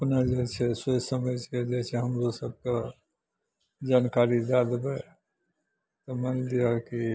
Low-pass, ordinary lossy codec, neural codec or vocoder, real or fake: none; none; none; real